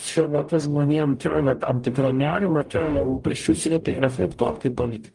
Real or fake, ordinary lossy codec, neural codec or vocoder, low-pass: fake; Opus, 32 kbps; codec, 44.1 kHz, 0.9 kbps, DAC; 10.8 kHz